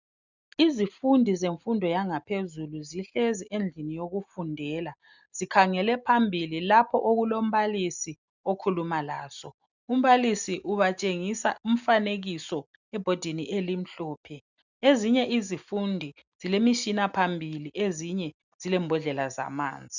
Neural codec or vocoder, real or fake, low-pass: none; real; 7.2 kHz